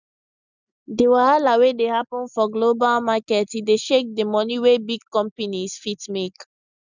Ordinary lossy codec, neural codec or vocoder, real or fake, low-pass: none; none; real; 7.2 kHz